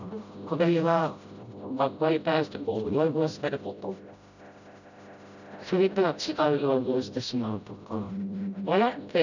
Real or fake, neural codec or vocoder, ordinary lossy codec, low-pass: fake; codec, 16 kHz, 0.5 kbps, FreqCodec, smaller model; none; 7.2 kHz